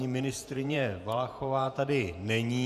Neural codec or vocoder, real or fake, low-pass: vocoder, 48 kHz, 128 mel bands, Vocos; fake; 14.4 kHz